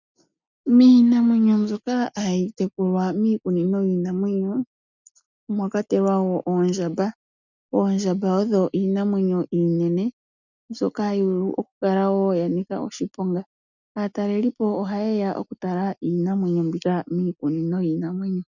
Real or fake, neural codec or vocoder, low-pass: real; none; 7.2 kHz